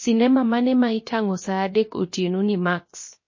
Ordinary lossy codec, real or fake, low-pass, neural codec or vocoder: MP3, 32 kbps; fake; 7.2 kHz; codec, 16 kHz, 0.7 kbps, FocalCodec